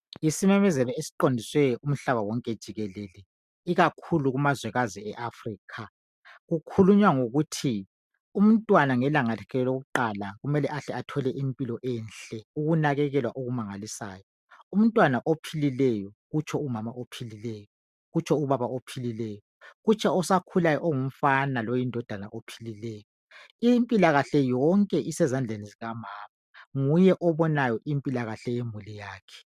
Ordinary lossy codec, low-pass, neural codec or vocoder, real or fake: MP3, 96 kbps; 14.4 kHz; none; real